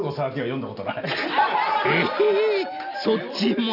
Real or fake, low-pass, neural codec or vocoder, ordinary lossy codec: real; 5.4 kHz; none; none